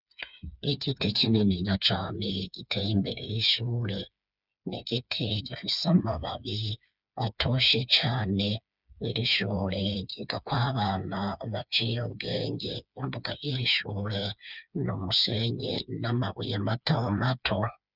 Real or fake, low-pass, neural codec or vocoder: fake; 5.4 kHz; codec, 16 kHz, 4 kbps, FreqCodec, smaller model